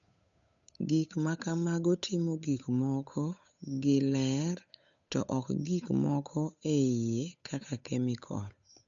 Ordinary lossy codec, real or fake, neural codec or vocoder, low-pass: none; fake; codec, 16 kHz, 8 kbps, FunCodec, trained on Chinese and English, 25 frames a second; 7.2 kHz